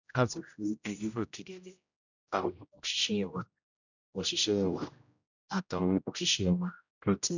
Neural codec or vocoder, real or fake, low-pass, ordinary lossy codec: codec, 16 kHz, 0.5 kbps, X-Codec, HuBERT features, trained on general audio; fake; 7.2 kHz; none